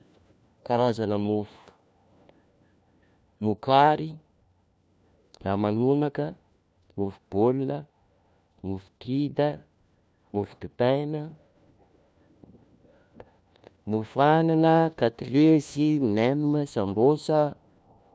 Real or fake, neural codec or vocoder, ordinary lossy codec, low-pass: fake; codec, 16 kHz, 1 kbps, FunCodec, trained on LibriTTS, 50 frames a second; none; none